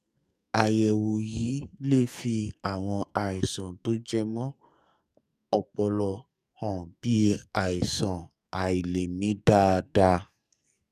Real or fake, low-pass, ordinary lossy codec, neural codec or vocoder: fake; 14.4 kHz; none; codec, 44.1 kHz, 2.6 kbps, SNAC